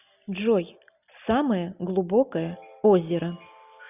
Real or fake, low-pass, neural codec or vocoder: real; 3.6 kHz; none